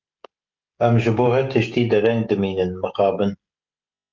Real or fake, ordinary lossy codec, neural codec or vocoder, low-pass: fake; Opus, 32 kbps; codec, 16 kHz, 16 kbps, FreqCodec, smaller model; 7.2 kHz